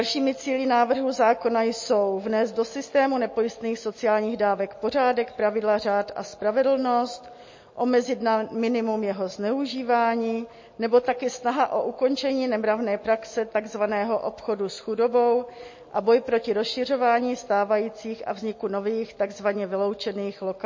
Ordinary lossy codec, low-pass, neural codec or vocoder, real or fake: MP3, 32 kbps; 7.2 kHz; none; real